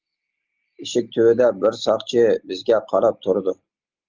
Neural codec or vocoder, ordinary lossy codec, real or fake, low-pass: none; Opus, 32 kbps; real; 7.2 kHz